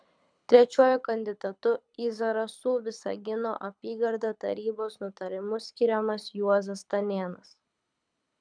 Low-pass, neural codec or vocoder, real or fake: 9.9 kHz; codec, 24 kHz, 6 kbps, HILCodec; fake